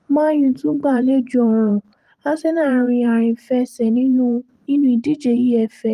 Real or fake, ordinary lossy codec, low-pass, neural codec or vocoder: fake; Opus, 32 kbps; 14.4 kHz; vocoder, 44.1 kHz, 128 mel bands every 512 samples, BigVGAN v2